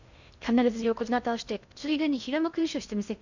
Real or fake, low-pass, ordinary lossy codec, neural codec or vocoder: fake; 7.2 kHz; none; codec, 16 kHz in and 24 kHz out, 0.6 kbps, FocalCodec, streaming, 2048 codes